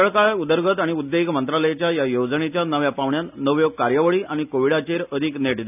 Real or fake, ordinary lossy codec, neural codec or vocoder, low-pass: real; none; none; 3.6 kHz